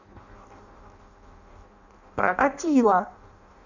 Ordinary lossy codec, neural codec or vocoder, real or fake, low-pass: none; codec, 16 kHz in and 24 kHz out, 0.6 kbps, FireRedTTS-2 codec; fake; 7.2 kHz